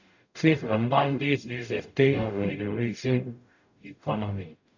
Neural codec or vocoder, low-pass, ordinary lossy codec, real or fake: codec, 44.1 kHz, 0.9 kbps, DAC; 7.2 kHz; none; fake